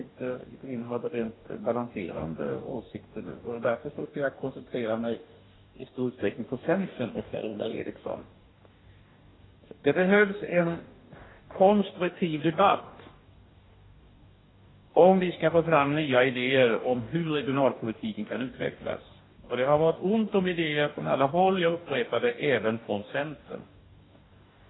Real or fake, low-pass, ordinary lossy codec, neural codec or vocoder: fake; 7.2 kHz; AAC, 16 kbps; codec, 44.1 kHz, 2.6 kbps, DAC